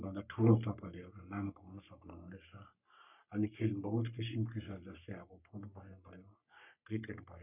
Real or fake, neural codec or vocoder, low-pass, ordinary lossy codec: fake; codec, 44.1 kHz, 3.4 kbps, Pupu-Codec; 3.6 kHz; none